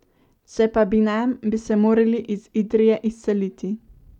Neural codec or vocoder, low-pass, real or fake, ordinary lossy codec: none; 19.8 kHz; real; none